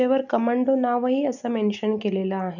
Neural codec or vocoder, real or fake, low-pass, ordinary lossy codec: none; real; 7.2 kHz; none